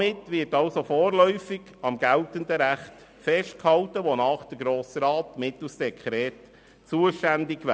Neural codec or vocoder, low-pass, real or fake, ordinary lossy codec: none; none; real; none